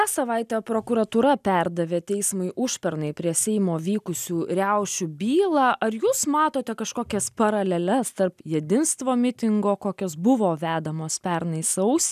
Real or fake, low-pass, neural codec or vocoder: real; 14.4 kHz; none